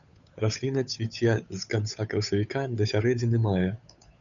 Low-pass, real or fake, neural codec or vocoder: 7.2 kHz; fake; codec, 16 kHz, 8 kbps, FunCodec, trained on Chinese and English, 25 frames a second